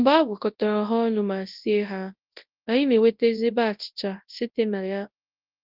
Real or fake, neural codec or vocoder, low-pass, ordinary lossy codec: fake; codec, 24 kHz, 0.9 kbps, WavTokenizer, large speech release; 5.4 kHz; Opus, 32 kbps